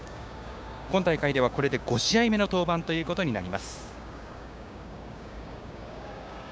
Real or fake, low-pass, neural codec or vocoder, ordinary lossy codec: fake; none; codec, 16 kHz, 6 kbps, DAC; none